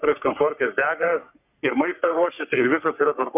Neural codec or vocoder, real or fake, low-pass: codec, 44.1 kHz, 3.4 kbps, Pupu-Codec; fake; 3.6 kHz